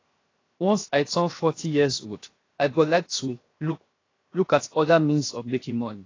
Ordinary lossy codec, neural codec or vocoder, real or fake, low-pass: AAC, 32 kbps; codec, 16 kHz, 0.7 kbps, FocalCodec; fake; 7.2 kHz